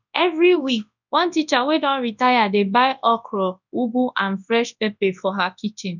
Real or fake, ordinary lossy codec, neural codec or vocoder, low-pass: fake; none; codec, 24 kHz, 0.9 kbps, WavTokenizer, large speech release; 7.2 kHz